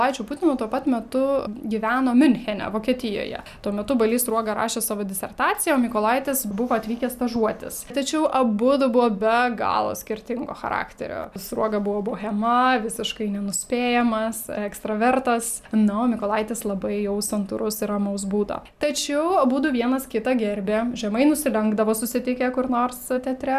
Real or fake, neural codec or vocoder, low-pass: real; none; 14.4 kHz